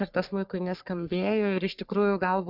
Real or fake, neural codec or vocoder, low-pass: fake; codec, 44.1 kHz, 3.4 kbps, Pupu-Codec; 5.4 kHz